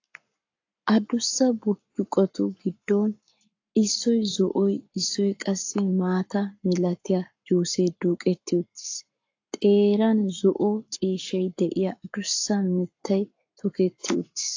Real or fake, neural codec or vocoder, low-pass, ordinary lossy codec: fake; codec, 44.1 kHz, 7.8 kbps, Pupu-Codec; 7.2 kHz; AAC, 48 kbps